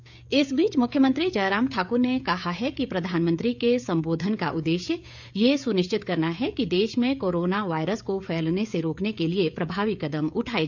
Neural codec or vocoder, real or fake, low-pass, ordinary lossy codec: codec, 16 kHz, 16 kbps, FreqCodec, smaller model; fake; 7.2 kHz; none